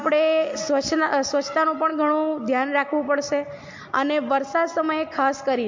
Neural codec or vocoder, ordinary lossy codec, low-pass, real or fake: none; MP3, 48 kbps; 7.2 kHz; real